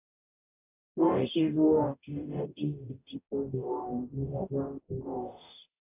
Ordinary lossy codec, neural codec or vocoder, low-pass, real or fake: AAC, 24 kbps; codec, 44.1 kHz, 0.9 kbps, DAC; 3.6 kHz; fake